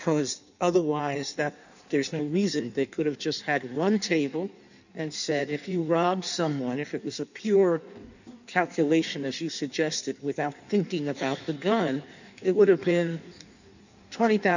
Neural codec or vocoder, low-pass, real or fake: codec, 16 kHz in and 24 kHz out, 1.1 kbps, FireRedTTS-2 codec; 7.2 kHz; fake